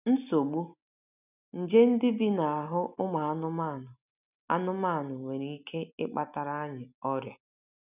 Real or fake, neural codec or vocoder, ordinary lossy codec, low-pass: real; none; none; 3.6 kHz